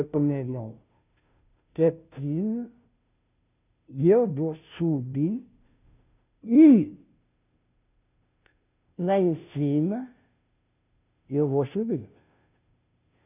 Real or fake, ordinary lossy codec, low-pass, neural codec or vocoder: fake; none; 3.6 kHz; codec, 16 kHz, 0.5 kbps, FunCodec, trained on Chinese and English, 25 frames a second